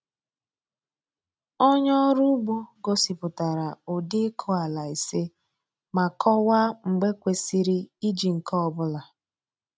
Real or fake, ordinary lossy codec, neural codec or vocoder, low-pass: real; none; none; none